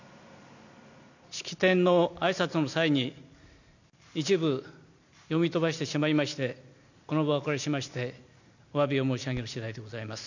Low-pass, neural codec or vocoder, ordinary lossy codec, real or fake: 7.2 kHz; none; none; real